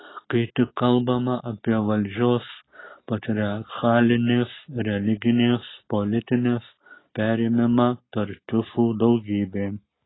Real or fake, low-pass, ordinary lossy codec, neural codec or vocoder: real; 7.2 kHz; AAC, 16 kbps; none